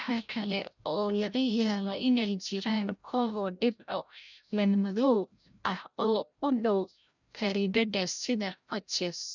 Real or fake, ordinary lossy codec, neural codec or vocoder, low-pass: fake; none; codec, 16 kHz, 0.5 kbps, FreqCodec, larger model; 7.2 kHz